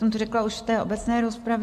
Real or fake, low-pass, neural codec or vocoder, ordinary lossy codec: real; 14.4 kHz; none; MP3, 64 kbps